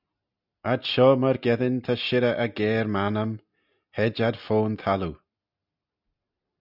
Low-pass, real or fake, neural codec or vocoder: 5.4 kHz; real; none